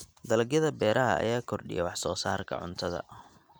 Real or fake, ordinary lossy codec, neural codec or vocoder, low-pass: real; none; none; none